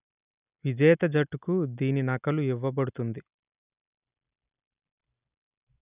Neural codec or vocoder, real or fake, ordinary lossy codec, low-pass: none; real; none; 3.6 kHz